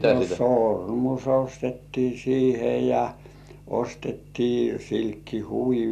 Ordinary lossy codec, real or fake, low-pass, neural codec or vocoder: none; real; 14.4 kHz; none